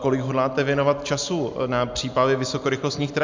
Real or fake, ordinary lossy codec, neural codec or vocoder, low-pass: real; MP3, 64 kbps; none; 7.2 kHz